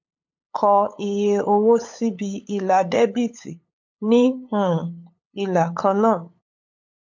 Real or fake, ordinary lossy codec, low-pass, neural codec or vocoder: fake; MP3, 48 kbps; 7.2 kHz; codec, 16 kHz, 8 kbps, FunCodec, trained on LibriTTS, 25 frames a second